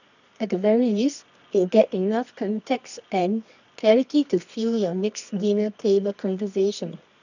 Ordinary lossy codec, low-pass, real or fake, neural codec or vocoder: none; 7.2 kHz; fake; codec, 24 kHz, 0.9 kbps, WavTokenizer, medium music audio release